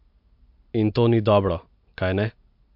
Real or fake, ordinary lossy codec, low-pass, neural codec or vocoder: real; MP3, 48 kbps; 5.4 kHz; none